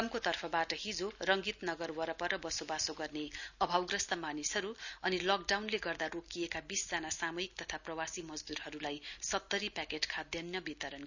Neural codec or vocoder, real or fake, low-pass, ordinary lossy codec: none; real; 7.2 kHz; none